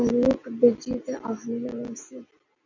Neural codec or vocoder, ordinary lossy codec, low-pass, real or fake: none; MP3, 64 kbps; 7.2 kHz; real